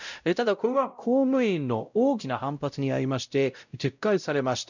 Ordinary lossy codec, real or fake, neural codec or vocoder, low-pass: none; fake; codec, 16 kHz, 0.5 kbps, X-Codec, WavLM features, trained on Multilingual LibriSpeech; 7.2 kHz